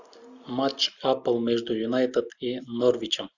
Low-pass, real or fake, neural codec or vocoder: 7.2 kHz; real; none